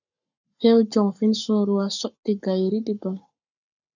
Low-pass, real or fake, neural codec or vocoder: 7.2 kHz; fake; codec, 44.1 kHz, 7.8 kbps, Pupu-Codec